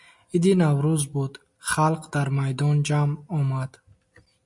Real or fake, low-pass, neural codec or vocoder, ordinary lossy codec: real; 10.8 kHz; none; MP3, 64 kbps